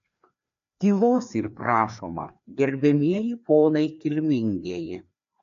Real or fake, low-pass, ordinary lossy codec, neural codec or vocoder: fake; 7.2 kHz; MP3, 64 kbps; codec, 16 kHz, 2 kbps, FreqCodec, larger model